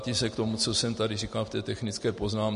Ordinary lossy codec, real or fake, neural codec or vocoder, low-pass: MP3, 48 kbps; real; none; 10.8 kHz